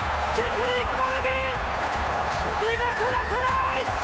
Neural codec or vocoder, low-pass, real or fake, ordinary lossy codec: codec, 16 kHz, 2 kbps, FunCodec, trained on Chinese and English, 25 frames a second; none; fake; none